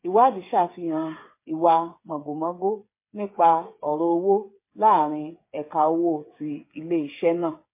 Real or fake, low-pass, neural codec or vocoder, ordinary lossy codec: fake; 3.6 kHz; codec, 16 kHz, 8 kbps, FreqCodec, smaller model; MP3, 24 kbps